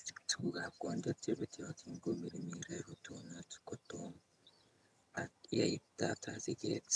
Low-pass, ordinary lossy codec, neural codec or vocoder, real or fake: none; none; vocoder, 22.05 kHz, 80 mel bands, HiFi-GAN; fake